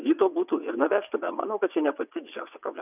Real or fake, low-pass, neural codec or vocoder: fake; 3.6 kHz; vocoder, 22.05 kHz, 80 mel bands, WaveNeXt